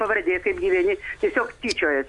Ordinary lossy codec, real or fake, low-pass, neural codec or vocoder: AAC, 64 kbps; real; 10.8 kHz; none